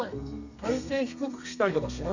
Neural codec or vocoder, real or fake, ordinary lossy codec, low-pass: codec, 32 kHz, 1.9 kbps, SNAC; fake; none; 7.2 kHz